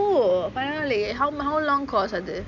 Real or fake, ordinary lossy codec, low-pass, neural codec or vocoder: real; none; 7.2 kHz; none